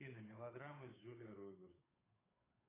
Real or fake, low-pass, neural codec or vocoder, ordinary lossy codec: fake; 3.6 kHz; codec, 16 kHz, 8 kbps, FunCodec, trained on Chinese and English, 25 frames a second; AAC, 16 kbps